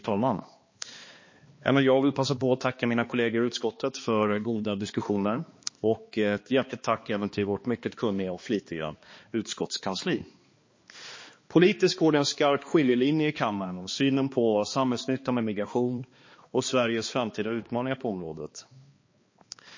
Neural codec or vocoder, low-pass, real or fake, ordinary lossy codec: codec, 16 kHz, 2 kbps, X-Codec, HuBERT features, trained on balanced general audio; 7.2 kHz; fake; MP3, 32 kbps